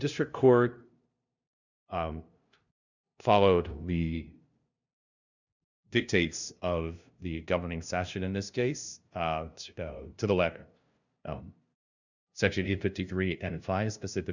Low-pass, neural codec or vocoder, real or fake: 7.2 kHz; codec, 16 kHz, 0.5 kbps, FunCodec, trained on LibriTTS, 25 frames a second; fake